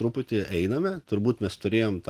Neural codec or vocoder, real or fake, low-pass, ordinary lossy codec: none; real; 14.4 kHz; Opus, 24 kbps